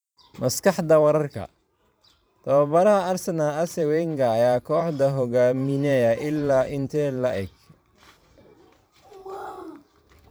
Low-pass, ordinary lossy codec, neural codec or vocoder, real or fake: none; none; vocoder, 44.1 kHz, 128 mel bands every 512 samples, BigVGAN v2; fake